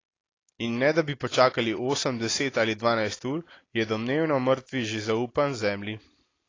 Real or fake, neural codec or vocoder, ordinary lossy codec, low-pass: real; none; AAC, 32 kbps; 7.2 kHz